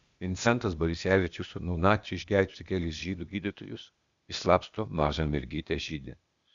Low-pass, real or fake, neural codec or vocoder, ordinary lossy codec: 7.2 kHz; fake; codec, 16 kHz, 0.8 kbps, ZipCodec; Opus, 64 kbps